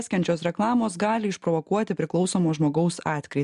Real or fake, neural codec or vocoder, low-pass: real; none; 10.8 kHz